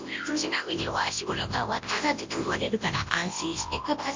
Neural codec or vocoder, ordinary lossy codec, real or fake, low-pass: codec, 24 kHz, 0.9 kbps, WavTokenizer, large speech release; MP3, 48 kbps; fake; 7.2 kHz